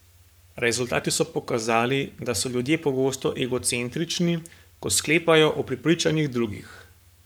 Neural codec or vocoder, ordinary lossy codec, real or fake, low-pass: codec, 44.1 kHz, 7.8 kbps, Pupu-Codec; none; fake; none